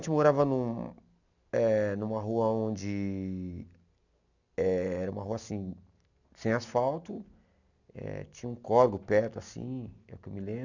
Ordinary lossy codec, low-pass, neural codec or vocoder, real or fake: none; 7.2 kHz; none; real